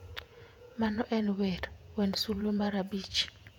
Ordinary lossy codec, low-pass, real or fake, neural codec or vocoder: none; 19.8 kHz; fake; vocoder, 48 kHz, 128 mel bands, Vocos